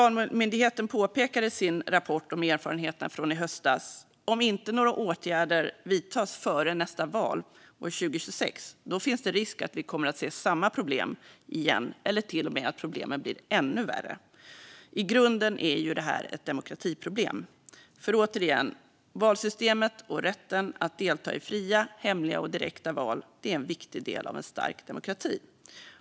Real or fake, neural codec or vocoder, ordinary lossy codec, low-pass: real; none; none; none